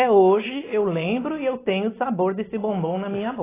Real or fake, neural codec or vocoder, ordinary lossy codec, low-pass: real; none; AAC, 16 kbps; 3.6 kHz